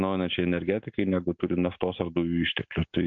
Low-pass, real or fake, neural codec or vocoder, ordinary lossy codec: 7.2 kHz; real; none; MP3, 48 kbps